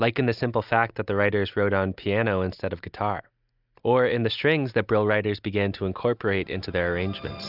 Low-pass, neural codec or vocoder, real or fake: 5.4 kHz; none; real